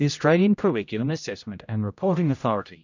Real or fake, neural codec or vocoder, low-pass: fake; codec, 16 kHz, 0.5 kbps, X-Codec, HuBERT features, trained on general audio; 7.2 kHz